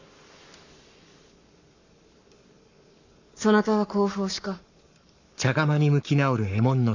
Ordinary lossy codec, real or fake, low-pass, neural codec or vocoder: none; fake; 7.2 kHz; codec, 44.1 kHz, 7.8 kbps, Pupu-Codec